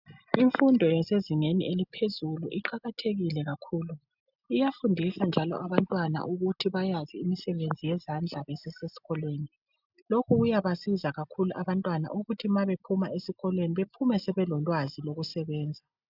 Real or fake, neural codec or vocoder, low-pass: real; none; 5.4 kHz